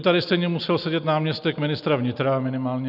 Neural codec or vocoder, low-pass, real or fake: none; 5.4 kHz; real